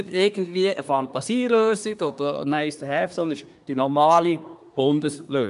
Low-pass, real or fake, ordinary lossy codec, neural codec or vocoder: 10.8 kHz; fake; none; codec, 24 kHz, 1 kbps, SNAC